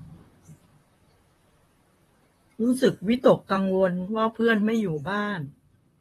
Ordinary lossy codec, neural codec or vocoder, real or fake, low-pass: AAC, 32 kbps; vocoder, 44.1 kHz, 128 mel bands, Pupu-Vocoder; fake; 19.8 kHz